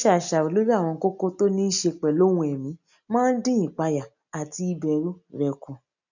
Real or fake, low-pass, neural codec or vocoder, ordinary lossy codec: real; 7.2 kHz; none; none